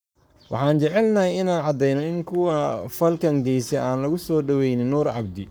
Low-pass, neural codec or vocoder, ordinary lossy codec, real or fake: none; codec, 44.1 kHz, 7.8 kbps, Pupu-Codec; none; fake